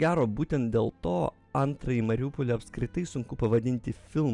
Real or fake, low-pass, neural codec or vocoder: real; 10.8 kHz; none